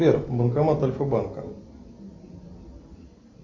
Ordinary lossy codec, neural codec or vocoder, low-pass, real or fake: AAC, 32 kbps; none; 7.2 kHz; real